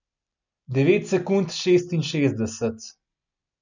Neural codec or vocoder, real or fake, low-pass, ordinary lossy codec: none; real; 7.2 kHz; none